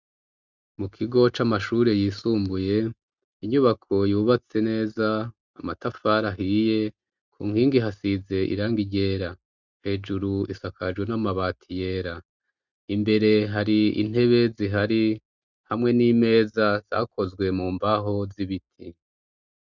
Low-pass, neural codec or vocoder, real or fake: 7.2 kHz; none; real